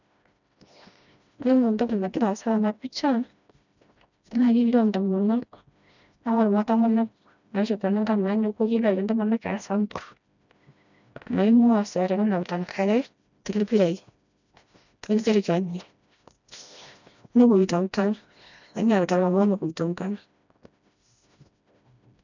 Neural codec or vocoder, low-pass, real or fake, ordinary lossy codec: codec, 16 kHz, 1 kbps, FreqCodec, smaller model; 7.2 kHz; fake; none